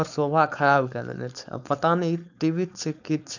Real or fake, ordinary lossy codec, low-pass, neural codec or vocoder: fake; none; 7.2 kHz; codec, 16 kHz, 4.8 kbps, FACodec